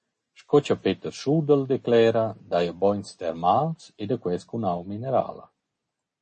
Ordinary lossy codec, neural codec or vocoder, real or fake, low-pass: MP3, 32 kbps; none; real; 10.8 kHz